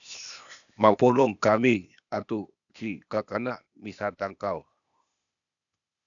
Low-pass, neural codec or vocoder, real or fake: 7.2 kHz; codec, 16 kHz, 0.8 kbps, ZipCodec; fake